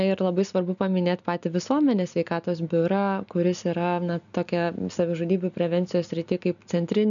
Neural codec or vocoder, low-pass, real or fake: none; 7.2 kHz; real